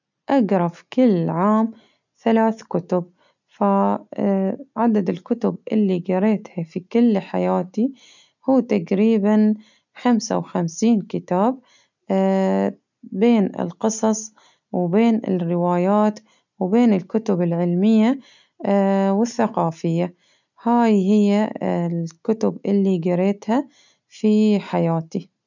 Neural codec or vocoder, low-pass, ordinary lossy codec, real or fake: none; 7.2 kHz; none; real